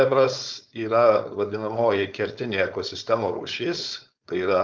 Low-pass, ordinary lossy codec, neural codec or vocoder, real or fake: 7.2 kHz; Opus, 24 kbps; codec, 16 kHz, 4.8 kbps, FACodec; fake